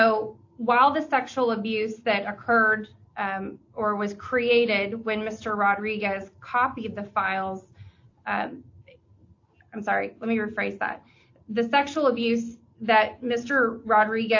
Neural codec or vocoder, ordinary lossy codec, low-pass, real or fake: none; AAC, 48 kbps; 7.2 kHz; real